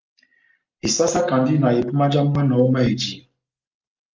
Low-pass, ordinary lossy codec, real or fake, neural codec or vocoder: 7.2 kHz; Opus, 24 kbps; real; none